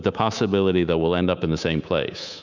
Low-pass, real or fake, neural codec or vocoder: 7.2 kHz; fake; codec, 24 kHz, 3.1 kbps, DualCodec